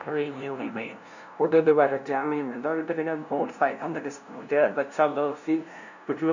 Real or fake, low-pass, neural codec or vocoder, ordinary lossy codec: fake; 7.2 kHz; codec, 16 kHz, 0.5 kbps, FunCodec, trained on LibriTTS, 25 frames a second; none